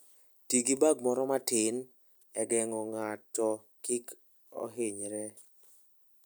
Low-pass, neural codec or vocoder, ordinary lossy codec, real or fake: none; none; none; real